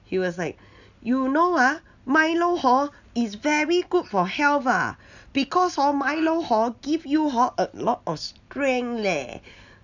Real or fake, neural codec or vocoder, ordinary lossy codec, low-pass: real; none; none; 7.2 kHz